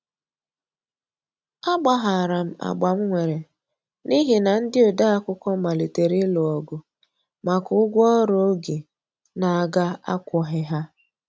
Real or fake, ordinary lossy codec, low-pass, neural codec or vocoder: real; none; none; none